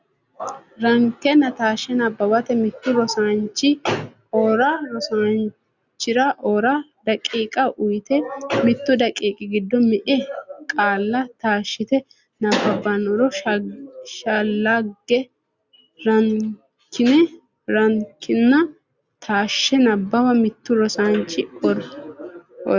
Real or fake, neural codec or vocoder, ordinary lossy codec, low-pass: real; none; Opus, 64 kbps; 7.2 kHz